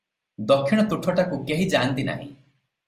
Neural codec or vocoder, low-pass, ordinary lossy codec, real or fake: none; 14.4 kHz; Opus, 32 kbps; real